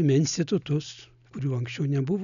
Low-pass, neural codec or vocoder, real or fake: 7.2 kHz; none; real